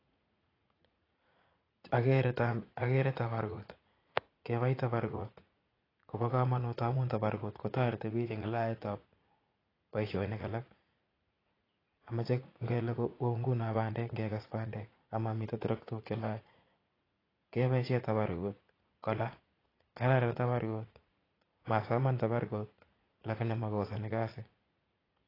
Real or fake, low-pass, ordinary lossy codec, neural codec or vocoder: fake; 5.4 kHz; AAC, 24 kbps; vocoder, 44.1 kHz, 80 mel bands, Vocos